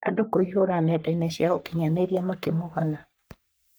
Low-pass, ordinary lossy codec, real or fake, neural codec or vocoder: none; none; fake; codec, 44.1 kHz, 3.4 kbps, Pupu-Codec